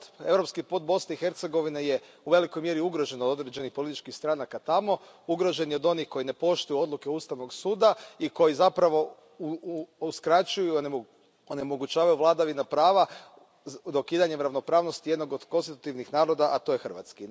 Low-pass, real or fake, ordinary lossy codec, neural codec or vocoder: none; real; none; none